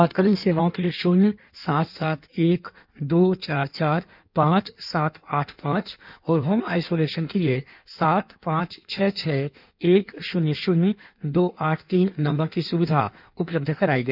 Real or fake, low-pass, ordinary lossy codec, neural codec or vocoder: fake; 5.4 kHz; none; codec, 16 kHz in and 24 kHz out, 1.1 kbps, FireRedTTS-2 codec